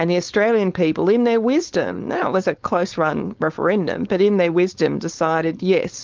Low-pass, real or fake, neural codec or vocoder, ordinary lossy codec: 7.2 kHz; fake; codec, 16 kHz, 4.8 kbps, FACodec; Opus, 32 kbps